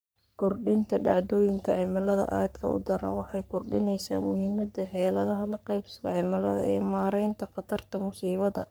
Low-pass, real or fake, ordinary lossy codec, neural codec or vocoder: none; fake; none; codec, 44.1 kHz, 3.4 kbps, Pupu-Codec